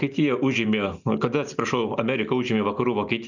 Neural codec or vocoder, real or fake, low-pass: none; real; 7.2 kHz